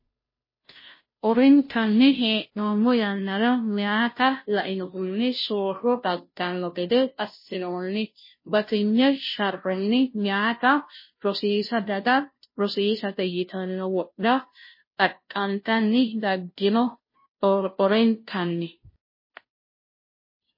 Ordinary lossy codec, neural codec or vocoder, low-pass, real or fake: MP3, 24 kbps; codec, 16 kHz, 0.5 kbps, FunCodec, trained on Chinese and English, 25 frames a second; 5.4 kHz; fake